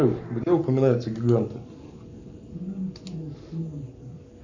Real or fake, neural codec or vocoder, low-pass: fake; codec, 44.1 kHz, 7.8 kbps, Pupu-Codec; 7.2 kHz